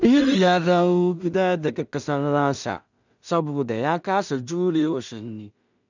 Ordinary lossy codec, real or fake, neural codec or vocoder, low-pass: none; fake; codec, 16 kHz in and 24 kHz out, 0.4 kbps, LongCat-Audio-Codec, two codebook decoder; 7.2 kHz